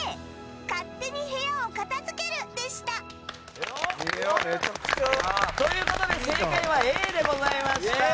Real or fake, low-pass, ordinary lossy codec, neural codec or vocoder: real; none; none; none